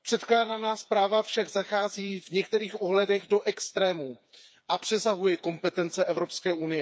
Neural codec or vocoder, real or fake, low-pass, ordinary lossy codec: codec, 16 kHz, 4 kbps, FreqCodec, smaller model; fake; none; none